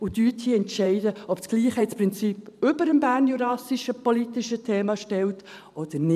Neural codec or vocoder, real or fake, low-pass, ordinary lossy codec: vocoder, 44.1 kHz, 128 mel bands every 512 samples, BigVGAN v2; fake; 14.4 kHz; none